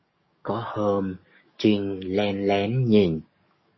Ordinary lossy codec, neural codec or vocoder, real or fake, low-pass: MP3, 24 kbps; codec, 44.1 kHz, 7.8 kbps, DAC; fake; 7.2 kHz